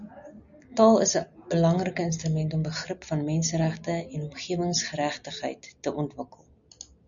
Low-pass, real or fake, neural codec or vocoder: 7.2 kHz; real; none